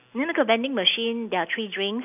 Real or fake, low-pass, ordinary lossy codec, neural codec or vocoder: real; 3.6 kHz; none; none